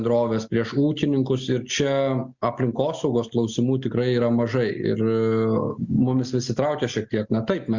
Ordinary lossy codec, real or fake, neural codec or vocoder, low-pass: Opus, 64 kbps; real; none; 7.2 kHz